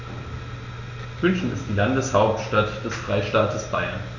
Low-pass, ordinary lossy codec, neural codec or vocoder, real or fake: 7.2 kHz; none; none; real